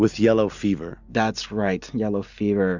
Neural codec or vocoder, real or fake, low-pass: none; real; 7.2 kHz